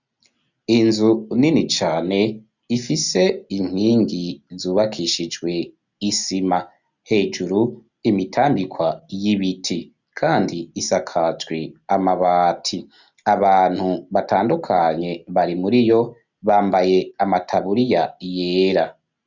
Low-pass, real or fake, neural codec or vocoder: 7.2 kHz; real; none